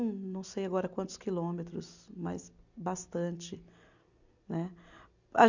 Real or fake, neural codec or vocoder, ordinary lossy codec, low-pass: real; none; none; 7.2 kHz